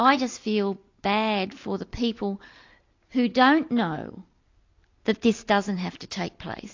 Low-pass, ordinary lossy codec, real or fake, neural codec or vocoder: 7.2 kHz; AAC, 48 kbps; real; none